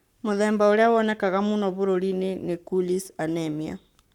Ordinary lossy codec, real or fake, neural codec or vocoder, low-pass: none; fake; codec, 44.1 kHz, 7.8 kbps, Pupu-Codec; 19.8 kHz